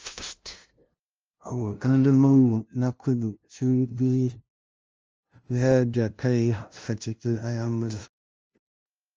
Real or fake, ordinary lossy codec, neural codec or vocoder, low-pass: fake; Opus, 24 kbps; codec, 16 kHz, 0.5 kbps, FunCodec, trained on LibriTTS, 25 frames a second; 7.2 kHz